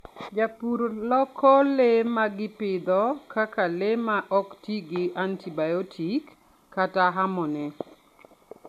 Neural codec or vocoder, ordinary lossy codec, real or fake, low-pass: none; none; real; 14.4 kHz